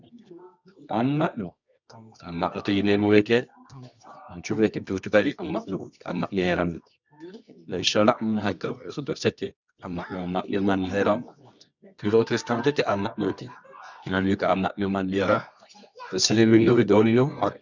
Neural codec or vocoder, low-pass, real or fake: codec, 24 kHz, 0.9 kbps, WavTokenizer, medium music audio release; 7.2 kHz; fake